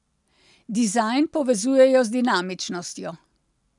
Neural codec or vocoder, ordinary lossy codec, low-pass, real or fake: none; none; 10.8 kHz; real